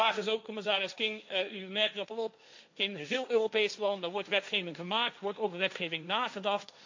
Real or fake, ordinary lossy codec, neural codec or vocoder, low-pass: fake; MP3, 48 kbps; codec, 16 kHz, 1.1 kbps, Voila-Tokenizer; 7.2 kHz